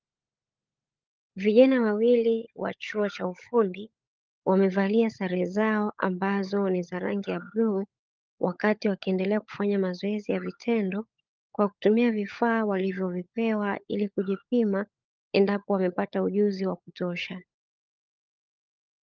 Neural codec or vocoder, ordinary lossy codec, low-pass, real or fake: codec, 16 kHz, 16 kbps, FunCodec, trained on LibriTTS, 50 frames a second; Opus, 24 kbps; 7.2 kHz; fake